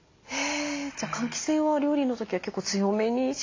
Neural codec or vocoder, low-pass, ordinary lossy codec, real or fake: none; 7.2 kHz; AAC, 32 kbps; real